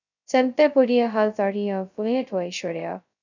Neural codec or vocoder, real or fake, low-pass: codec, 16 kHz, 0.2 kbps, FocalCodec; fake; 7.2 kHz